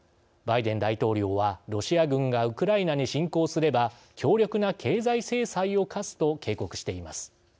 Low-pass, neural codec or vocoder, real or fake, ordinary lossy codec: none; none; real; none